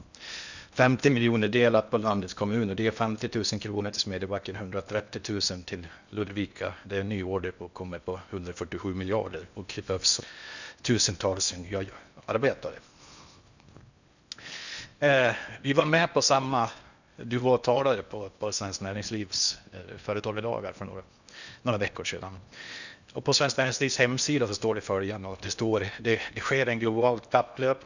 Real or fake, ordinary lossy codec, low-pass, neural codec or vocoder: fake; none; 7.2 kHz; codec, 16 kHz in and 24 kHz out, 0.8 kbps, FocalCodec, streaming, 65536 codes